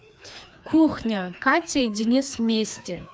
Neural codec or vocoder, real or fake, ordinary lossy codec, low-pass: codec, 16 kHz, 2 kbps, FreqCodec, larger model; fake; none; none